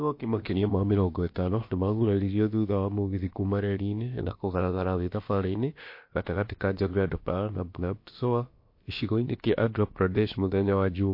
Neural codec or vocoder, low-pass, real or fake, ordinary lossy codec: codec, 16 kHz, about 1 kbps, DyCAST, with the encoder's durations; 5.4 kHz; fake; MP3, 32 kbps